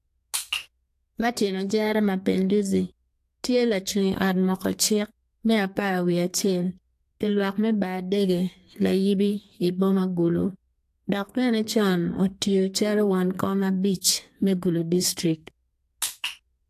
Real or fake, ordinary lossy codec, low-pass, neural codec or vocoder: fake; AAC, 64 kbps; 14.4 kHz; codec, 32 kHz, 1.9 kbps, SNAC